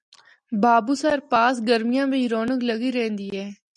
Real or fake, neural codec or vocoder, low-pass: real; none; 10.8 kHz